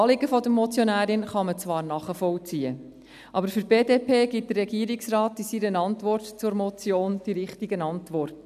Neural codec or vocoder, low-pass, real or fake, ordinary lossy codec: none; 14.4 kHz; real; none